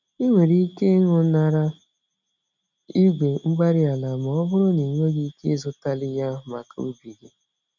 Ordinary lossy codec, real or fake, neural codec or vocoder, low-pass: none; real; none; 7.2 kHz